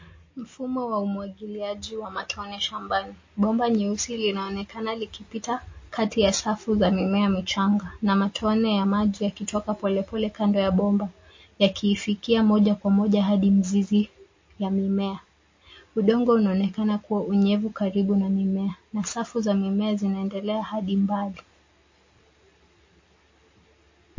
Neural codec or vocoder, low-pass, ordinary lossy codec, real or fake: none; 7.2 kHz; MP3, 32 kbps; real